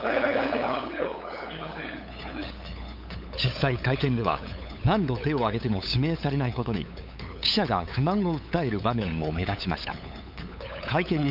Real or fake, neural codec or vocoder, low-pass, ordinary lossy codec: fake; codec, 16 kHz, 8 kbps, FunCodec, trained on LibriTTS, 25 frames a second; 5.4 kHz; none